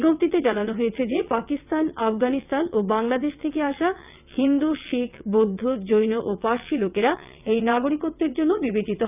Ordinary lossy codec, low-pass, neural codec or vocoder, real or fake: none; 3.6 kHz; vocoder, 22.05 kHz, 80 mel bands, WaveNeXt; fake